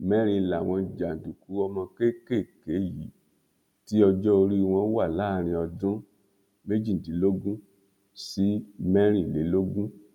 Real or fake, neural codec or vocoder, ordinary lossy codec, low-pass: real; none; none; 19.8 kHz